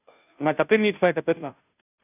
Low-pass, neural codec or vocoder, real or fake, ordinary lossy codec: 3.6 kHz; codec, 16 kHz, 0.5 kbps, FunCodec, trained on Chinese and English, 25 frames a second; fake; none